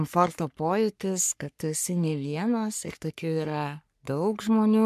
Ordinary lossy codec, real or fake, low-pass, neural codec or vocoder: MP3, 96 kbps; fake; 14.4 kHz; codec, 44.1 kHz, 3.4 kbps, Pupu-Codec